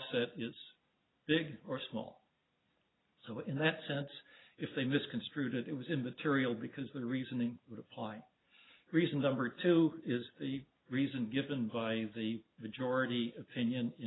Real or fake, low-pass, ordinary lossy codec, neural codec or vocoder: real; 7.2 kHz; AAC, 16 kbps; none